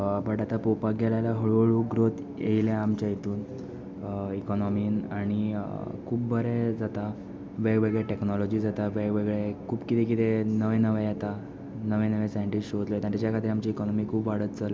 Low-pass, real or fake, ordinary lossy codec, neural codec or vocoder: none; real; none; none